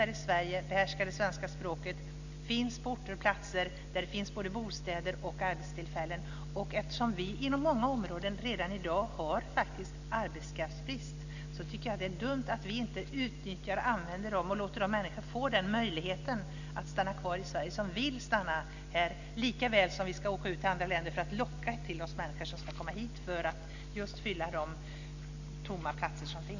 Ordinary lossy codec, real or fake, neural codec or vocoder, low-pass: none; real; none; 7.2 kHz